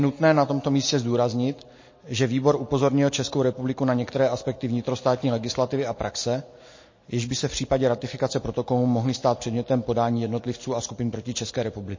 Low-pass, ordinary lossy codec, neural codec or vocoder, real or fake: 7.2 kHz; MP3, 32 kbps; none; real